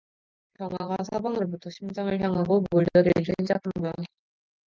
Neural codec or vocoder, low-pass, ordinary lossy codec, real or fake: none; 7.2 kHz; Opus, 24 kbps; real